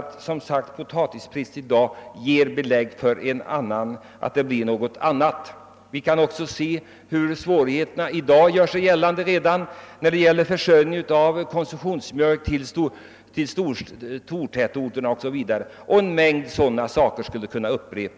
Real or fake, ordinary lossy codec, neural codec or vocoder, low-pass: real; none; none; none